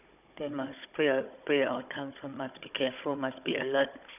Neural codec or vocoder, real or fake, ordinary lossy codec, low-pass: codec, 16 kHz, 16 kbps, FunCodec, trained on Chinese and English, 50 frames a second; fake; none; 3.6 kHz